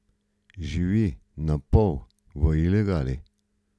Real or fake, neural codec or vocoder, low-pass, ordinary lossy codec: real; none; none; none